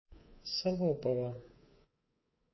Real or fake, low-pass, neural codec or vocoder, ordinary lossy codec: fake; 7.2 kHz; codec, 24 kHz, 3.1 kbps, DualCodec; MP3, 24 kbps